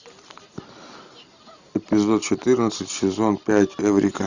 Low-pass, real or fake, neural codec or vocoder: 7.2 kHz; real; none